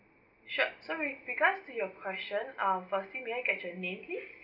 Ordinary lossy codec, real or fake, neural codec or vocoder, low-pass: none; real; none; 5.4 kHz